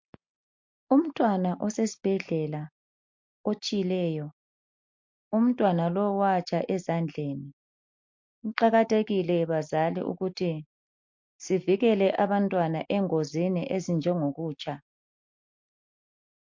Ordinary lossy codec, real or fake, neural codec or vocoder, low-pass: MP3, 48 kbps; real; none; 7.2 kHz